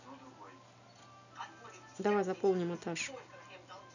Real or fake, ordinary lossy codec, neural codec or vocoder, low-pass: real; none; none; 7.2 kHz